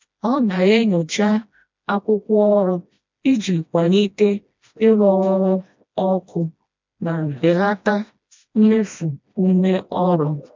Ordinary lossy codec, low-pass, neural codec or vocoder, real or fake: MP3, 64 kbps; 7.2 kHz; codec, 16 kHz, 1 kbps, FreqCodec, smaller model; fake